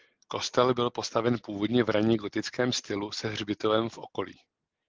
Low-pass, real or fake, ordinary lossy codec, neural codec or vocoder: 7.2 kHz; real; Opus, 16 kbps; none